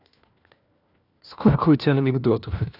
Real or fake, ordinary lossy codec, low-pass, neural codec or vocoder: fake; none; 5.4 kHz; codec, 16 kHz, 1 kbps, FunCodec, trained on LibriTTS, 50 frames a second